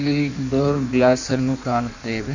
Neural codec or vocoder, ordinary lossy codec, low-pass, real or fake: codec, 16 kHz in and 24 kHz out, 1.1 kbps, FireRedTTS-2 codec; none; 7.2 kHz; fake